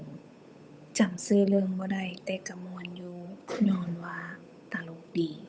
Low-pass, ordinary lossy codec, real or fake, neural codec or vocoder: none; none; fake; codec, 16 kHz, 8 kbps, FunCodec, trained on Chinese and English, 25 frames a second